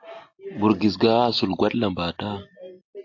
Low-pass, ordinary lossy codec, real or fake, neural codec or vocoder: 7.2 kHz; AAC, 48 kbps; real; none